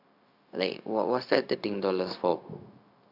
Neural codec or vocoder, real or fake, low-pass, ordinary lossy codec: codec, 16 kHz, 0.4 kbps, LongCat-Audio-Codec; fake; 5.4 kHz; AAC, 48 kbps